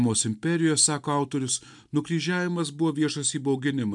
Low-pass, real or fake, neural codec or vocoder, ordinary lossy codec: 10.8 kHz; real; none; MP3, 96 kbps